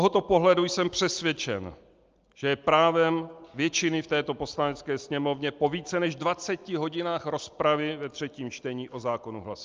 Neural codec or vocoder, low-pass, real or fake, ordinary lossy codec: none; 7.2 kHz; real; Opus, 24 kbps